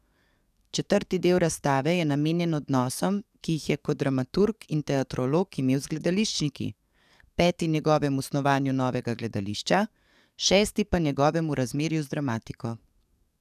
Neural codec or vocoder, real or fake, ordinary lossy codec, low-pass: codec, 44.1 kHz, 7.8 kbps, DAC; fake; none; 14.4 kHz